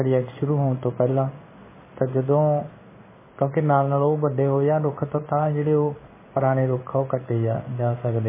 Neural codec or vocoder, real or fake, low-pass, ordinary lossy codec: codec, 16 kHz in and 24 kHz out, 1 kbps, XY-Tokenizer; fake; 3.6 kHz; MP3, 16 kbps